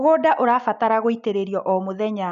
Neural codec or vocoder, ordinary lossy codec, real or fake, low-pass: none; none; real; 7.2 kHz